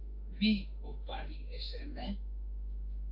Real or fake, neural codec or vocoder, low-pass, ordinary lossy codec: fake; autoencoder, 48 kHz, 32 numbers a frame, DAC-VAE, trained on Japanese speech; 5.4 kHz; AAC, 48 kbps